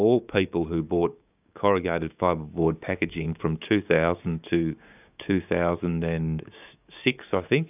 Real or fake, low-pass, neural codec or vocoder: fake; 3.6 kHz; codec, 16 kHz, 6 kbps, DAC